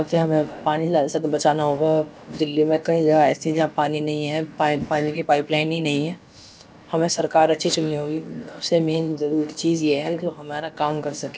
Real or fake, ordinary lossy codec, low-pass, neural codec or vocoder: fake; none; none; codec, 16 kHz, about 1 kbps, DyCAST, with the encoder's durations